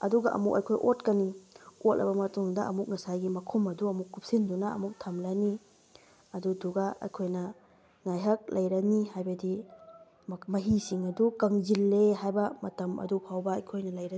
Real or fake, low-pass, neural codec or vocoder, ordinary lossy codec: real; none; none; none